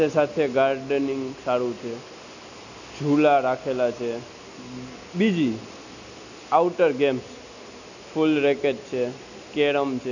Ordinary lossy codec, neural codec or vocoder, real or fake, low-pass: none; none; real; 7.2 kHz